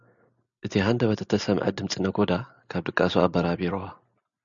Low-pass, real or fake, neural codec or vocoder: 7.2 kHz; real; none